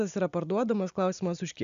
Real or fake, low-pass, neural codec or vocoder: real; 7.2 kHz; none